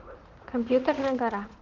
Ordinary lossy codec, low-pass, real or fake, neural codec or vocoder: Opus, 16 kbps; 7.2 kHz; fake; vocoder, 44.1 kHz, 80 mel bands, Vocos